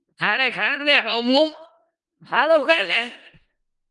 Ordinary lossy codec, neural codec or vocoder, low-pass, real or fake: Opus, 32 kbps; codec, 16 kHz in and 24 kHz out, 0.4 kbps, LongCat-Audio-Codec, four codebook decoder; 10.8 kHz; fake